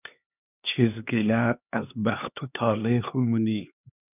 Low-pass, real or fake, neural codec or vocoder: 3.6 kHz; fake; codec, 16 kHz, 2 kbps, X-Codec, HuBERT features, trained on LibriSpeech